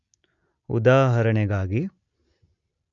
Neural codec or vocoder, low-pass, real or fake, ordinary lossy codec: none; 7.2 kHz; real; none